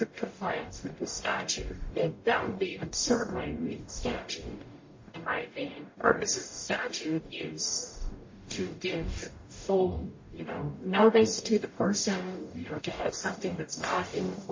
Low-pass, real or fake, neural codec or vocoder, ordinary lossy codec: 7.2 kHz; fake; codec, 44.1 kHz, 0.9 kbps, DAC; MP3, 32 kbps